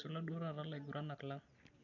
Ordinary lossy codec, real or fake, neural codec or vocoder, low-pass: none; real; none; 7.2 kHz